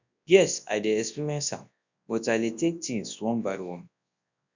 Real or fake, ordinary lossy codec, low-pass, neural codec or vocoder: fake; none; 7.2 kHz; codec, 24 kHz, 0.9 kbps, WavTokenizer, large speech release